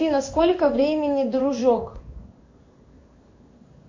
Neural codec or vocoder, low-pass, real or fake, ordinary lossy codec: codec, 16 kHz in and 24 kHz out, 1 kbps, XY-Tokenizer; 7.2 kHz; fake; MP3, 48 kbps